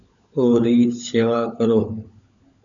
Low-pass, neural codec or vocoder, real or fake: 7.2 kHz; codec, 16 kHz, 16 kbps, FunCodec, trained on LibriTTS, 50 frames a second; fake